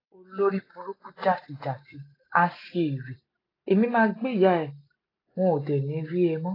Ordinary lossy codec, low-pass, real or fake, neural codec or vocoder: AAC, 24 kbps; 5.4 kHz; real; none